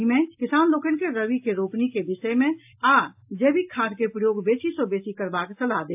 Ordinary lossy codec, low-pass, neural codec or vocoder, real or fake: AAC, 32 kbps; 3.6 kHz; none; real